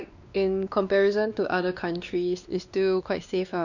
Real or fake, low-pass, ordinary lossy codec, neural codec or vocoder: fake; 7.2 kHz; none; codec, 16 kHz, 2 kbps, X-Codec, WavLM features, trained on Multilingual LibriSpeech